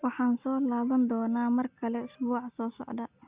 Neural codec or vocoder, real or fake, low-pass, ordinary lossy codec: none; real; 3.6 kHz; Opus, 64 kbps